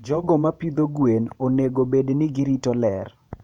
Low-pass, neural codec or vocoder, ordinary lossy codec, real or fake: 19.8 kHz; vocoder, 48 kHz, 128 mel bands, Vocos; none; fake